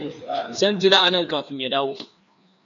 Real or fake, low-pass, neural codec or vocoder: fake; 7.2 kHz; codec, 16 kHz, 2 kbps, FreqCodec, larger model